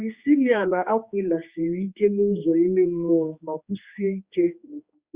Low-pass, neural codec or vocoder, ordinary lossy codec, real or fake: 3.6 kHz; codec, 16 kHz, 2 kbps, X-Codec, HuBERT features, trained on general audio; Opus, 64 kbps; fake